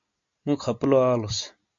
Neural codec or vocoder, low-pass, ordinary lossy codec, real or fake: none; 7.2 kHz; MP3, 48 kbps; real